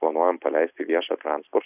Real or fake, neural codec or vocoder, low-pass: real; none; 3.6 kHz